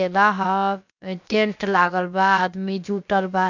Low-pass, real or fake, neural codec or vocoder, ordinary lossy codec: 7.2 kHz; fake; codec, 16 kHz, about 1 kbps, DyCAST, with the encoder's durations; none